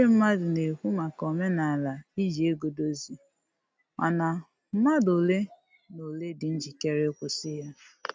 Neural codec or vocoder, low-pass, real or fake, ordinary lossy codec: none; none; real; none